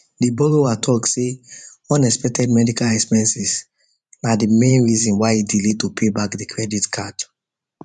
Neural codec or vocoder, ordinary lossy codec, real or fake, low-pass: vocoder, 44.1 kHz, 128 mel bands every 512 samples, BigVGAN v2; none; fake; 10.8 kHz